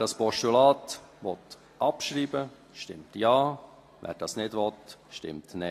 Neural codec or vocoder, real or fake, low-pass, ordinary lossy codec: none; real; 14.4 kHz; AAC, 48 kbps